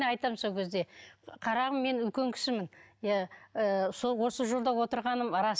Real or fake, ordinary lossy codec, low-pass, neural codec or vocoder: real; none; none; none